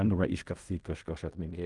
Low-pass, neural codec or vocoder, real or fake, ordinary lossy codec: 10.8 kHz; codec, 16 kHz in and 24 kHz out, 0.4 kbps, LongCat-Audio-Codec, fine tuned four codebook decoder; fake; Opus, 32 kbps